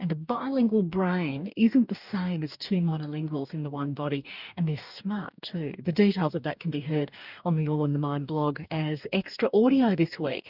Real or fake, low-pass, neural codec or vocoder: fake; 5.4 kHz; codec, 44.1 kHz, 2.6 kbps, DAC